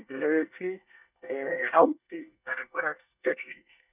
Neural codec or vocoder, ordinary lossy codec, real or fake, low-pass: codec, 24 kHz, 1 kbps, SNAC; none; fake; 3.6 kHz